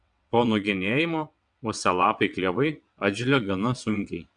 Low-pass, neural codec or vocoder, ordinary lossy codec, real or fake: 9.9 kHz; vocoder, 22.05 kHz, 80 mel bands, WaveNeXt; MP3, 96 kbps; fake